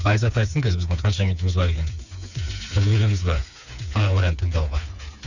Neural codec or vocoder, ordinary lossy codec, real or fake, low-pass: codec, 16 kHz, 4 kbps, FreqCodec, smaller model; none; fake; 7.2 kHz